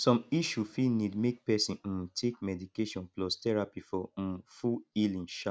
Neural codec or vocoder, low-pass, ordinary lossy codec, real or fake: none; none; none; real